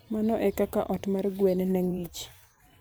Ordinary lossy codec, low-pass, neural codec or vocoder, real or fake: none; none; vocoder, 44.1 kHz, 128 mel bands every 512 samples, BigVGAN v2; fake